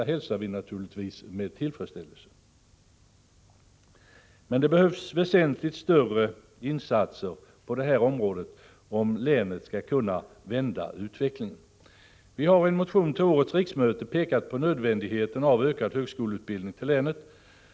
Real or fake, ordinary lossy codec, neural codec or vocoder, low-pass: real; none; none; none